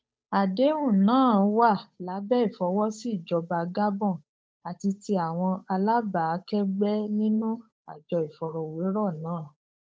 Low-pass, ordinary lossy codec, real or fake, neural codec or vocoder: none; none; fake; codec, 16 kHz, 8 kbps, FunCodec, trained on Chinese and English, 25 frames a second